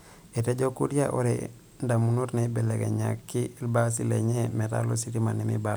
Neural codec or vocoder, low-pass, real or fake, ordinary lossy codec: none; none; real; none